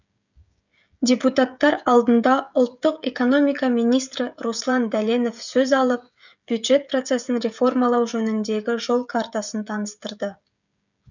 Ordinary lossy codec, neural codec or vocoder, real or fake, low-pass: none; codec, 16 kHz, 16 kbps, FreqCodec, smaller model; fake; 7.2 kHz